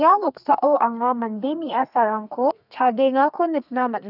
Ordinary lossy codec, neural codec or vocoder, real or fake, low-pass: none; codec, 32 kHz, 1.9 kbps, SNAC; fake; 5.4 kHz